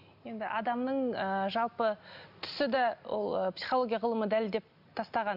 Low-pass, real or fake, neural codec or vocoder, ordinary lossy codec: 5.4 kHz; real; none; none